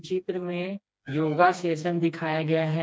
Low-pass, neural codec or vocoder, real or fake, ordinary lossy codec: none; codec, 16 kHz, 2 kbps, FreqCodec, smaller model; fake; none